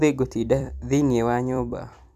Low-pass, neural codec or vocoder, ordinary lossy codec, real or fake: 14.4 kHz; vocoder, 44.1 kHz, 128 mel bands every 256 samples, BigVGAN v2; none; fake